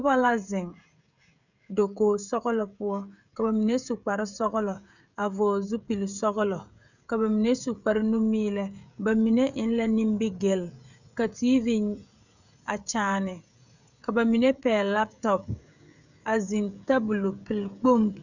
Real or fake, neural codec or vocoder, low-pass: fake; codec, 16 kHz, 16 kbps, FreqCodec, smaller model; 7.2 kHz